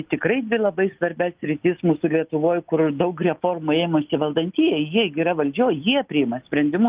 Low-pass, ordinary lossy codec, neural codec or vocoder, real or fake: 3.6 kHz; Opus, 32 kbps; none; real